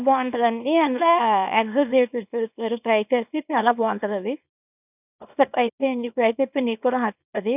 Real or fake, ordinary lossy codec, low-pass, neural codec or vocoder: fake; none; 3.6 kHz; codec, 24 kHz, 0.9 kbps, WavTokenizer, small release